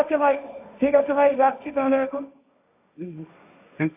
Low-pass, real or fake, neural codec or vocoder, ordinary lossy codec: 3.6 kHz; fake; codec, 16 kHz, 1.1 kbps, Voila-Tokenizer; AAC, 32 kbps